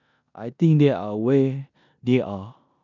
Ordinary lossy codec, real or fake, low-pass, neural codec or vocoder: none; fake; 7.2 kHz; codec, 16 kHz in and 24 kHz out, 0.9 kbps, LongCat-Audio-Codec, four codebook decoder